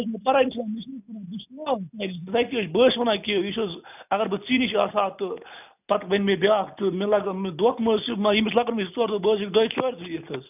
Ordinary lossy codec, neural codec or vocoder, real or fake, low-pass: none; none; real; 3.6 kHz